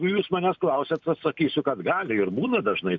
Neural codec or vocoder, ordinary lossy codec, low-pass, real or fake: none; MP3, 64 kbps; 7.2 kHz; real